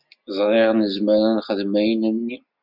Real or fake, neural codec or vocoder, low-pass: real; none; 5.4 kHz